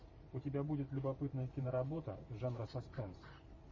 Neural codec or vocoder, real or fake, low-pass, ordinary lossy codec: vocoder, 44.1 kHz, 128 mel bands every 512 samples, BigVGAN v2; fake; 7.2 kHz; MP3, 32 kbps